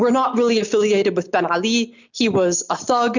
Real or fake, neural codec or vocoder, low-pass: real; none; 7.2 kHz